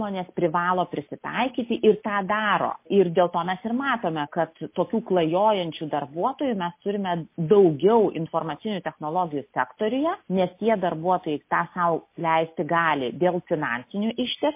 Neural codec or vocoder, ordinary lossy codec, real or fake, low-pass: none; MP3, 24 kbps; real; 3.6 kHz